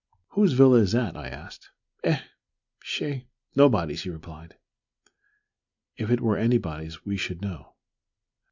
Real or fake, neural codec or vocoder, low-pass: real; none; 7.2 kHz